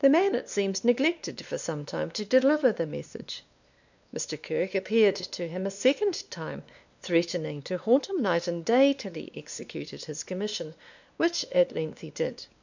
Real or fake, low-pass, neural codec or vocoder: fake; 7.2 kHz; codec, 16 kHz, 2 kbps, X-Codec, WavLM features, trained on Multilingual LibriSpeech